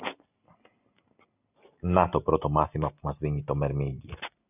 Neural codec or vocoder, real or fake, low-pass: none; real; 3.6 kHz